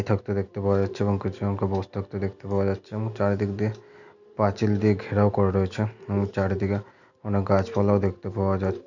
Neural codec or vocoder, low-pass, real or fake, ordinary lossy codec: none; 7.2 kHz; real; none